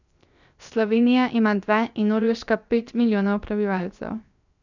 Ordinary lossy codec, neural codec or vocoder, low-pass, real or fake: none; codec, 16 kHz, 0.7 kbps, FocalCodec; 7.2 kHz; fake